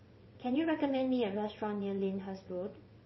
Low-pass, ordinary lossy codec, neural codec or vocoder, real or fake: 7.2 kHz; MP3, 24 kbps; vocoder, 22.05 kHz, 80 mel bands, WaveNeXt; fake